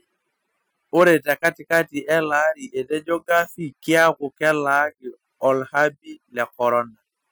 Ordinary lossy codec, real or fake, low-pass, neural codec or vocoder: none; real; none; none